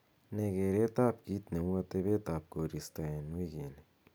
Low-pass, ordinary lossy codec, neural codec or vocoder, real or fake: none; none; none; real